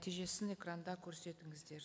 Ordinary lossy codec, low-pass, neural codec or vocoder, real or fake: none; none; none; real